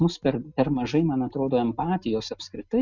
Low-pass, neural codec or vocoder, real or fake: 7.2 kHz; none; real